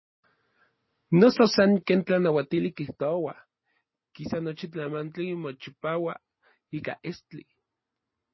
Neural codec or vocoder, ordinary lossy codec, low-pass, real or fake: none; MP3, 24 kbps; 7.2 kHz; real